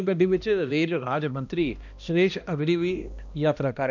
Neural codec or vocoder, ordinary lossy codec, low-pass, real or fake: codec, 16 kHz, 1 kbps, X-Codec, HuBERT features, trained on balanced general audio; none; 7.2 kHz; fake